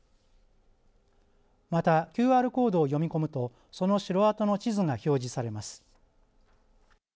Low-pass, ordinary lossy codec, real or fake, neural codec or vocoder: none; none; real; none